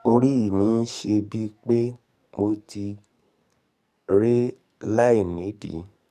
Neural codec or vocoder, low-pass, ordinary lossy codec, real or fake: codec, 44.1 kHz, 2.6 kbps, SNAC; 14.4 kHz; none; fake